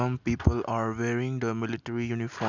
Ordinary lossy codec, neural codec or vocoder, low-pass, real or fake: none; none; 7.2 kHz; real